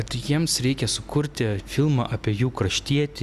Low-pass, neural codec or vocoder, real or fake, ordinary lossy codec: 14.4 kHz; none; real; Opus, 64 kbps